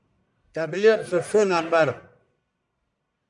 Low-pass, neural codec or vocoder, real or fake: 10.8 kHz; codec, 44.1 kHz, 1.7 kbps, Pupu-Codec; fake